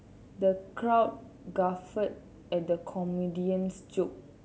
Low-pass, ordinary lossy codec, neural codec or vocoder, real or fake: none; none; none; real